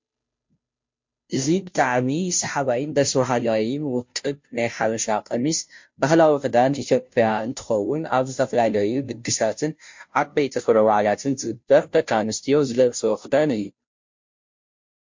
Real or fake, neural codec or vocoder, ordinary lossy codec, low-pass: fake; codec, 16 kHz, 0.5 kbps, FunCodec, trained on Chinese and English, 25 frames a second; MP3, 48 kbps; 7.2 kHz